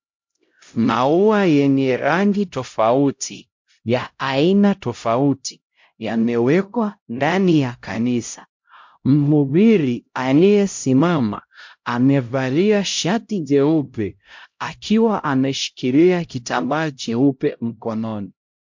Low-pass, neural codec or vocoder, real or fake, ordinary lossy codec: 7.2 kHz; codec, 16 kHz, 0.5 kbps, X-Codec, HuBERT features, trained on LibriSpeech; fake; MP3, 48 kbps